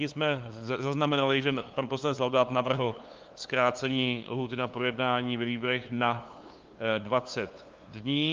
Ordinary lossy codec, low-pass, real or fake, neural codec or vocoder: Opus, 32 kbps; 7.2 kHz; fake; codec, 16 kHz, 2 kbps, FunCodec, trained on LibriTTS, 25 frames a second